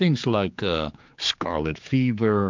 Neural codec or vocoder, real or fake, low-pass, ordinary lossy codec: codec, 16 kHz, 4 kbps, X-Codec, HuBERT features, trained on general audio; fake; 7.2 kHz; MP3, 64 kbps